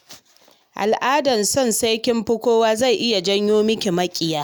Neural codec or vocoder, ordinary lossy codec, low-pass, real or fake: none; none; none; real